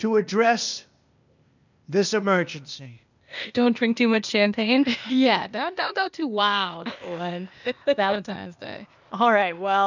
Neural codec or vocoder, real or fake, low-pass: codec, 16 kHz, 0.8 kbps, ZipCodec; fake; 7.2 kHz